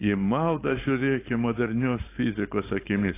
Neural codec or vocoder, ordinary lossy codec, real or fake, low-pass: codec, 16 kHz, 4.8 kbps, FACodec; AAC, 24 kbps; fake; 3.6 kHz